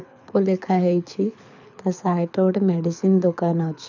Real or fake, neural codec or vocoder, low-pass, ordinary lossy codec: fake; codec, 24 kHz, 6 kbps, HILCodec; 7.2 kHz; none